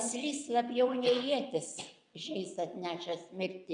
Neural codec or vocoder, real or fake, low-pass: vocoder, 22.05 kHz, 80 mel bands, WaveNeXt; fake; 9.9 kHz